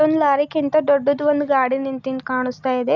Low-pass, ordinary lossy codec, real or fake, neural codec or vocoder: 7.2 kHz; none; real; none